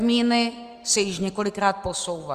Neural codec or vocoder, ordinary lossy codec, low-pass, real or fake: none; Opus, 32 kbps; 14.4 kHz; real